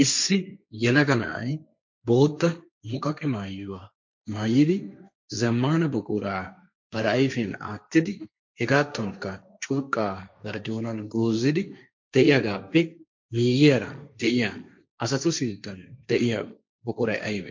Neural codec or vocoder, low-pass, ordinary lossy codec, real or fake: codec, 16 kHz, 1.1 kbps, Voila-Tokenizer; 7.2 kHz; MP3, 64 kbps; fake